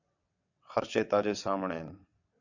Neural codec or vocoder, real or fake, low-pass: vocoder, 22.05 kHz, 80 mel bands, WaveNeXt; fake; 7.2 kHz